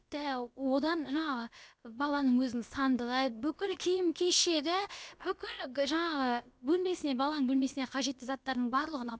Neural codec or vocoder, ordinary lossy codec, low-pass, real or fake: codec, 16 kHz, about 1 kbps, DyCAST, with the encoder's durations; none; none; fake